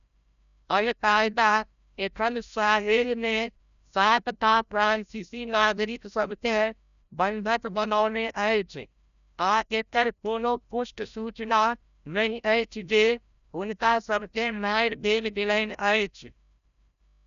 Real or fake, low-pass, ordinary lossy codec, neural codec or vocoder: fake; 7.2 kHz; none; codec, 16 kHz, 0.5 kbps, FreqCodec, larger model